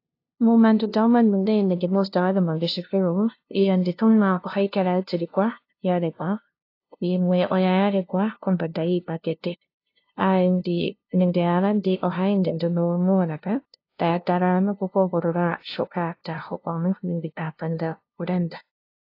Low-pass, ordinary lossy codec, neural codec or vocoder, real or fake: 5.4 kHz; AAC, 32 kbps; codec, 16 kHz, 0.5 kbps, FunCodec, trained on LibriTTS, 25 frames a second; fake